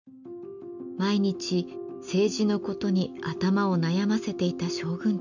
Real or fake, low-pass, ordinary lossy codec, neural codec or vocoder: real; 7.2 kHz; none; none